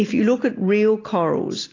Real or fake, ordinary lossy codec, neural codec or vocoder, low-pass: real; AAC, 32 kbps; none; 7.2 kHz